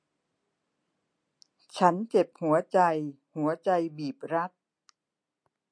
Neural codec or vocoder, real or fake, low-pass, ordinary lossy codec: none; real; 9.9 kHz; MP3, 48 kbps